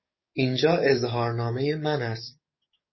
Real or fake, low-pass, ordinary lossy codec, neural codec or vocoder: fake; 7.2 kHz; MP3, 24 kbps; codec, 44.1 kHz, 7.8 kbps, DAC